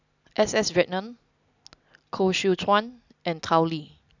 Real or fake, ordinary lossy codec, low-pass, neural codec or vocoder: real; none; 7.2 kHz; none